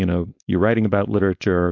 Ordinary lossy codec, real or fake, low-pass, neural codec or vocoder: AAC, 48 kbps; fake; 7.2 kHz; codec, 16 kHz, 4.8 kbps, FACodec